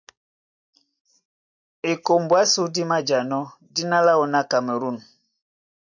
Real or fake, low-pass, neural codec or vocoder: real; 7.2 kHz; none